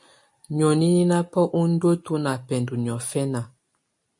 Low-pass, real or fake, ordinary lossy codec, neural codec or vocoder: 10.8 kHz; real; MP3, 48 kbps; none